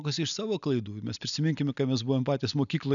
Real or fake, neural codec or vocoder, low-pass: real; none; 7.2 kHz